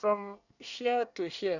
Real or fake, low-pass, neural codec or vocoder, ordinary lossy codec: fake; 7.2 kHz; codec, 24 kHz, 1 kbps, SNAC; none